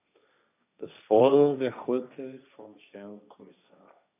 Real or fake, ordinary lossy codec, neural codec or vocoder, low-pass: fake; none; codec, 16 kHz, 1.1 kbps, Voila-Tokenizer; 3.6 kHz